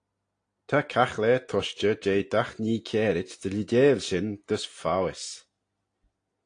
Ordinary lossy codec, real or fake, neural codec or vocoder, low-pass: AAC, 48 kbps; real; none; 9.9 kHz